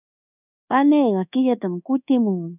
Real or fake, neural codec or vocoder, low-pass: fake; codec, 24 kHz, 1.2 kbps, DualCodec; 3.6 kHz